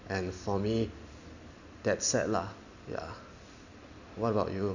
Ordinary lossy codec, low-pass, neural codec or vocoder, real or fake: none; 7.2 kHz; none; real